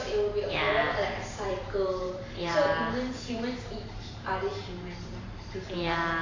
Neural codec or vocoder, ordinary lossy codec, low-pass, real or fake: vocoder, 44.1 kHz, 128 mel bands every 512 samples, BigVGAN v2; AAC, 32 kbps; 7.2 kHz; fake